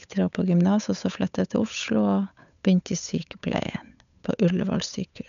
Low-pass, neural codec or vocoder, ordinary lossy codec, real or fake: 7.2 kHz; codec, 16 kHz, 8 kbps, FunCodec, trained on Chinese and English, 25 frames a second; none; fake